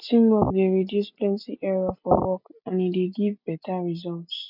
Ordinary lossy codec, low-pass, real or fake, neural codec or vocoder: none; 5.4 kHz; real; none